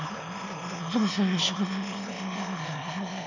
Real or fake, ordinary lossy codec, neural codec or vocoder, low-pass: fake; none; autoencoder, 22.05 kHz, a latent of 192 numbers a frame, VITS, trained on one speaker; 7.2 kHz